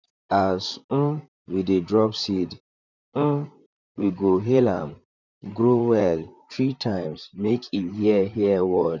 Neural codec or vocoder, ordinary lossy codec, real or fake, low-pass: vocoder, 44.1 kHz, 128 mel bands, Pupu-Vocoder; none; fake; 7.2 kHz